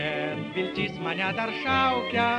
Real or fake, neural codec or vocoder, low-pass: real; none; 9.9 kHz